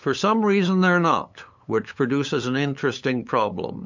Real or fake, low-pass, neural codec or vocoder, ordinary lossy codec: fake; 7.2 kHz; codec, 16 kHz, 8 kbps, FunCodec, trained on LibriTTS, 25 frames a second; MP3, 64 kbps